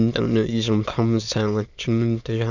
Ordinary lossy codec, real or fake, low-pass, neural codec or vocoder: none; fake; 7.2 kHz; autoencoder, 22.05 kHz, a latent of 192 numbers a frame, VITS, trained on many speakers